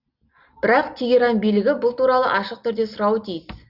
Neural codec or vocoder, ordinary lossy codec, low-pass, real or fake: none; Opus, 64 kbps; 5.4 kHz; real